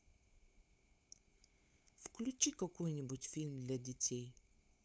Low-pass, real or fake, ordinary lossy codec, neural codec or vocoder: none; fake; none; codec, 16 kHz, 8 kbps, FunCodec, trained on LibriTTS, 25 frames a second